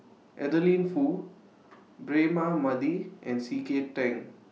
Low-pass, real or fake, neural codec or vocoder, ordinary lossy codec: none; real; none; none